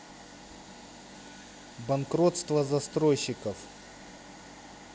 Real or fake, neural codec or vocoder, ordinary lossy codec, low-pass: real; none; none; none